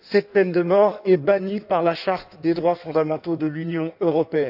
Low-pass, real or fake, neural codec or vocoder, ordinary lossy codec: 5.4 kHz; fake; codec, 16 kHz in and 24 kHz out, 1.1 kbps, FireRedTTS-2 codec; none